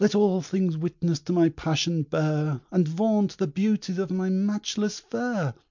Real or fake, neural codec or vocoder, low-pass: real; none; 7.2 kHz